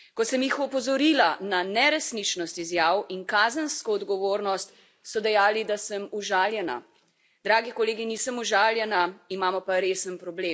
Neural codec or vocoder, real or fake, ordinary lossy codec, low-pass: none; real; none; none